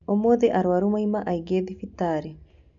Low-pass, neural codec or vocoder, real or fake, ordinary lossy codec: 7.2 kHz; none; real; none